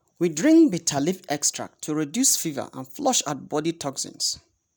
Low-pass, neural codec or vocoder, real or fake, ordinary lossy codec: none; none; real; none